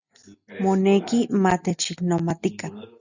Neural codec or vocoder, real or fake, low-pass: none; real; 7.2 kHz